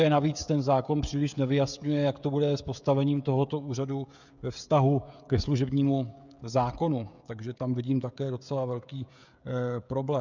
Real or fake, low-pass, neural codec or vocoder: fake; 7.2 kHz; codec, 16 kHz, 16 kbps, FreqCodec, smaller model